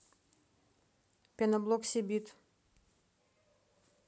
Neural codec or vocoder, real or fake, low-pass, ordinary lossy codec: none; real; none; none